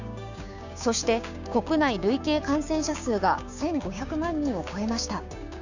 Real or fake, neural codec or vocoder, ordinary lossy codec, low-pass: fake; codec, 16 kHz, 6 kbps, DAC; none; 7.2 kHz